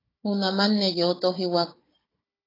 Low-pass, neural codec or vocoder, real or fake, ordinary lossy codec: 5.4 kHz; codec, 16 kHz, 16 kbps, FunCodec, trained on Chinese and English, 50 frames a second; fake; AAC, 24 kbps